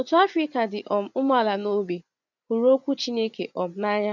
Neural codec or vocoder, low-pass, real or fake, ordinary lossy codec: vocoder, 24 kHz, 100 mel bands, Vocos; 7.2 kHz; fake; none